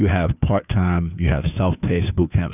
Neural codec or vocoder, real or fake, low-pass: codec, 16 kHz, 2 kbps, FunCodec, trained on Chinese and English, 25 frames a second; fake; 3.6 kHz